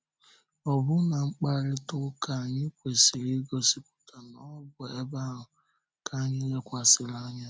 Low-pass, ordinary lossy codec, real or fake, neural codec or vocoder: none; none; real; none